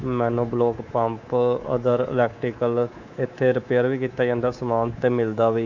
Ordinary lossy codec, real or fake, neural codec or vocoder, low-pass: none; fake; codec, 24 kHz, 3.1 kbps, DualCodec; 7.2 kHz